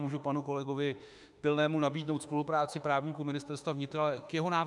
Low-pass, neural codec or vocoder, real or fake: 10.8 kHz; autoencoder, 48 kHz, 32 numbers a frame, DAC-VAE, trained on Japanese speech; fake